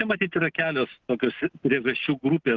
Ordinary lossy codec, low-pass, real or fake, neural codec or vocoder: Opus, 16 kbps; 7.2 kHz; real; none